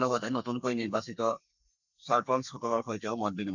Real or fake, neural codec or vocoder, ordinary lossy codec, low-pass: fake; codec, 32 kHz, 1.9 kbps, SNAC; none; 7.2 kHz